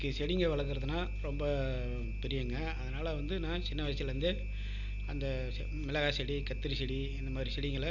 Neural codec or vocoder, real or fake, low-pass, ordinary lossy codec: none; real; 7.2 kHz; none